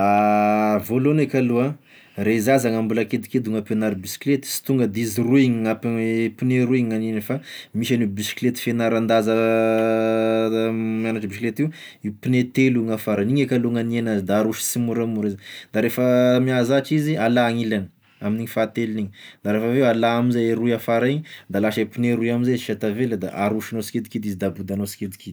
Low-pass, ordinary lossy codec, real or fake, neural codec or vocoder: none; none; real; none